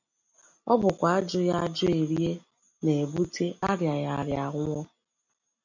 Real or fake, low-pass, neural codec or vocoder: real; 7.2 kHz; none